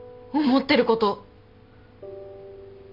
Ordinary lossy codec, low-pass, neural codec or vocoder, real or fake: none; 5.4 kHz; none; real